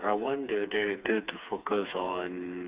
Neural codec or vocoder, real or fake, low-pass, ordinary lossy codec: codec, 16 kHz, 4 kbps, FreqCodec, smaller model; fake; 3.6 kHz; Opus, 64 kbps